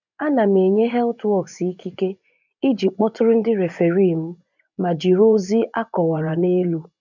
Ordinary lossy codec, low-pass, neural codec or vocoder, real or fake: none; 7.2 kHz; vocoder, 24 kHz, 100 mel bands, Vocos; fake